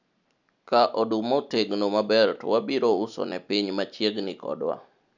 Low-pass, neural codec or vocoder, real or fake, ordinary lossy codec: 7.2 kHz; none; real; none